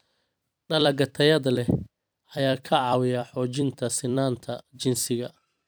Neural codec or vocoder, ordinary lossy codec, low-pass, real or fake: vocoder, 44.1 kHz, 128 mel bands every 256 samples, BigVGAN v2; none; none; fake